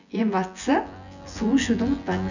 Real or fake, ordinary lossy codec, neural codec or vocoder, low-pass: fake; none; vocoder, 24 kHz, 100 mel bands, Vocos; 7.2 kHz